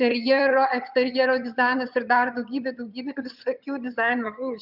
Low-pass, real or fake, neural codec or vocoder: 5.4 kHz; fake; vocoder, 22.05 kHz, 80 mel bands, HiFi-GAN